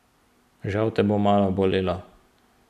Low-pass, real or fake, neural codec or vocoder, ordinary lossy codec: 14.4 kHz; real; none; none